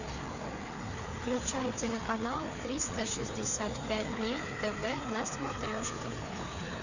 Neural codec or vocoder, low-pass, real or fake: codec, 16 kHz, 4 kbps, FreqCodec, larger model; 7.2 kHz; fake